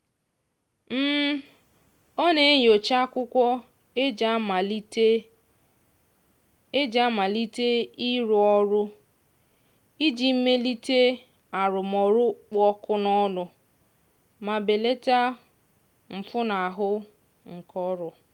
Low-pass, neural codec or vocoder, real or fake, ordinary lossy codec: 19.8 kHz; none; real; Opus, 32 kbps